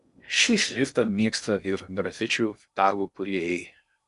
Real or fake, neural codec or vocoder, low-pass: fake; codec, 16 kHz in and 24 kHz out, 0.6 kbps, FocalCodec, streaming, 2048 codes; 10.8 kHz